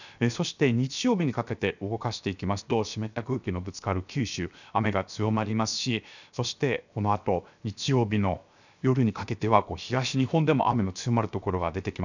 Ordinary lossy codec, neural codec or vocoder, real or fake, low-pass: none; codec, 16 kHz, 0.7 kbps, FocalCodec; fake; 7.2 kHz